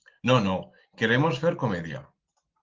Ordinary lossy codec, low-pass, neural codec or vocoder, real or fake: Opus, 16 kbps; 7.2 kHz; none; real